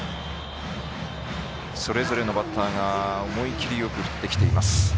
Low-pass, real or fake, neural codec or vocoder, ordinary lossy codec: none; real; none; none